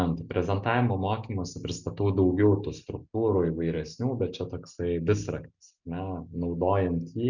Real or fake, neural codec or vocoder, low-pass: real; none; 7.2 kHz